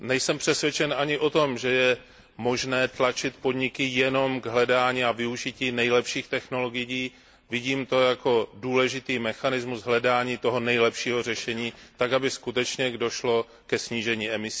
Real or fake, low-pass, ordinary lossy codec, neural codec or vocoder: real; none; none; none